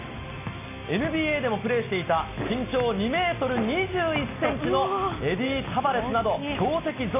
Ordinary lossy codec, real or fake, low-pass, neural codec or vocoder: none; real; 3.6 kHz; none